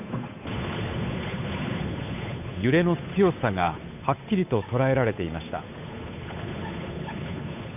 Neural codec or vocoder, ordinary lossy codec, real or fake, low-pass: codec, 16 kHz, 8 kbps, FunCodec, trained on Chinese and English, 25 frames a second; none; fake; 3.6 kHz